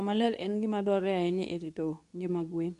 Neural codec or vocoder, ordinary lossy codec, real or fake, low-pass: codec, 24 kHz, 0.9 kbps, WavTokenizer, medium speech release version 2; none; fake; 10.8 kHz